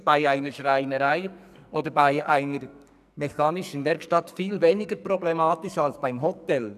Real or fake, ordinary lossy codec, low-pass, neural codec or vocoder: fake; none; 14.4 kHz; codec, 44.1 kHz, 2.6 kbps, SNAC